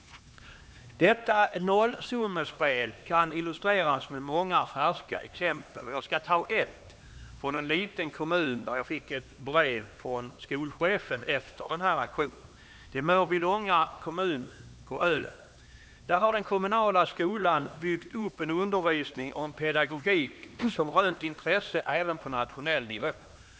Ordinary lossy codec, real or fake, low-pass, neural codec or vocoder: none; fake; none; codec, 16 kHz, 2 kbps, X-Codec, HuBERT features, trained on LibriSpeech